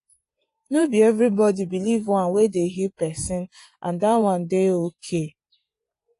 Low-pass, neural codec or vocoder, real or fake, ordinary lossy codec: 10.8 kHz; vocoder, 24 kHz, 100 mel bands, Vocos; fake; AAC, 48 kbps